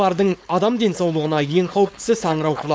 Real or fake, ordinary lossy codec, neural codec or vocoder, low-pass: fake; none; codec, 16 kHz, 4.8 kbps, FACodec; none